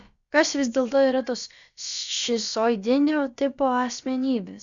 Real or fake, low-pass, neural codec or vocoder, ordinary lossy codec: fake; 7.2 kHz; codec, 16 kHz, about 1 kbps, DyCAST, with the encoder's durations; Opus, 64 kbps